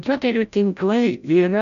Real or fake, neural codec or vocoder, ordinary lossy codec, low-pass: fake; codec, 16 kHz, 0.5 kbps, FreqCodec, larger model; MP3, 96 kbps; 7.2 kHz